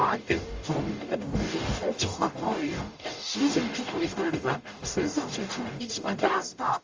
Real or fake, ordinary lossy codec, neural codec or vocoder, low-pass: fake; Opus, 32 kbps; codec, 44.1 kHz, 0.9 kbps, DAC; 7.2 kHz